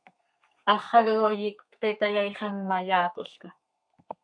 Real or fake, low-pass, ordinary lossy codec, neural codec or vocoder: fake; 9.9 kHz; AAC, 64 kbps; codec, 32 kHz, 1.9 kbps, SNAC